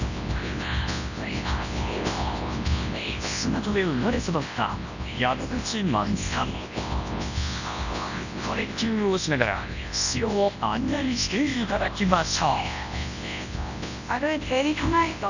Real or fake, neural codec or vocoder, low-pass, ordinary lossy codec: fake; codec, 24 kHz, 0.9 kbps, WavTokenizer, large speech release; 7.2 kHz; none